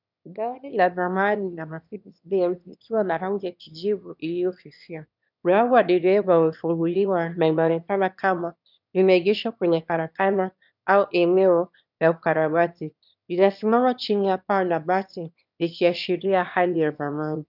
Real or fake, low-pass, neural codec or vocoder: fake; 5.4 kHz; autoencoder, 22.05 kHz, a latent of 192 numbers a frame, VITS, trained on one speaker